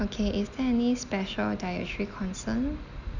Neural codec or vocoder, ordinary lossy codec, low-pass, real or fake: none; none; 7.2 kHz; real